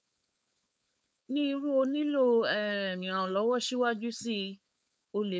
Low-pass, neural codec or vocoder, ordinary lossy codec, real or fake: none; codec, 16 kHz, 4.8 kbps, FACodec; none; fake